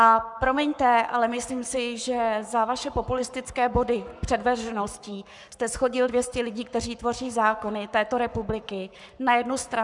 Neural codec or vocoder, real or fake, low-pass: codec, 44.1 kHz, 7.8 kbps, Pupu-Codec; fake; 10.8 kHz